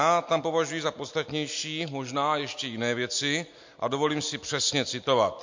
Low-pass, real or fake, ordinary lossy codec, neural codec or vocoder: 7.2 kHz; real; MP3, 48 kbps; none